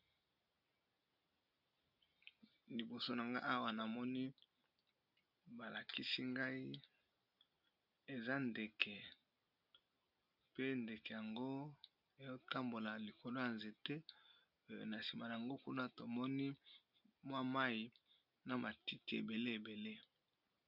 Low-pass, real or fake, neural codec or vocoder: 5.4 kHz; real; none